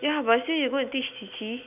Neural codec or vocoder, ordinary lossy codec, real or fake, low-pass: none; none; real; 3.6 kHz